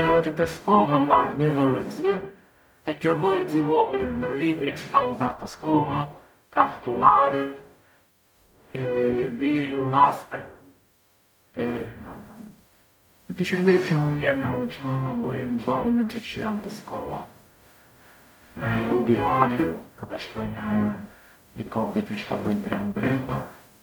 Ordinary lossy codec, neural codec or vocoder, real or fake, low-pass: none; codec, 44.1 kHz, 0.9 kbps, DAC; fake; none